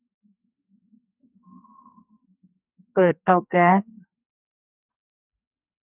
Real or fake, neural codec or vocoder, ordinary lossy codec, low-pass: fake; codec, 44.1 kHz, 2.6 kbps, SNAC; none; 3.6 kHz